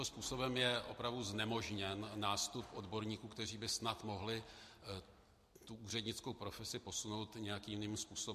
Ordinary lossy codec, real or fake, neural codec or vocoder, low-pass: MP3, 64 kbps; real; none; 14.4 kHz